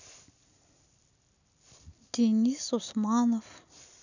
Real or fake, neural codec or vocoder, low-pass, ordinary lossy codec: real; none; 7.2 kHz; none